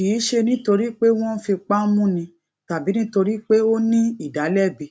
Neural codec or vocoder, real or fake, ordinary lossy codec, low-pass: none; real; none; none